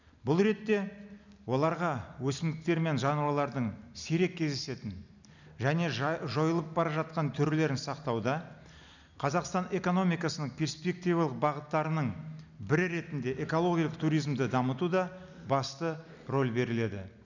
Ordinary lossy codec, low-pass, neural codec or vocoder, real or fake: none; 7.2 kHz; none; real